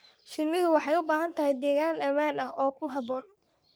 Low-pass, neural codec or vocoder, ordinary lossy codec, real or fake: none; codec, 44.1 kHz, 3.4 kbps, Pupu-Codec; none; fake